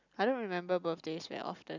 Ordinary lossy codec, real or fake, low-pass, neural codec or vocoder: none; real; 7.2 kHz; none